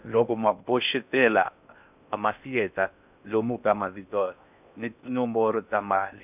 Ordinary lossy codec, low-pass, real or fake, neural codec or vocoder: none; 3.6 kHz; fake; codec, 16 kHz in and 24 kHz out, 0.6 kbps, FocalCodec, streaming, 4096 codes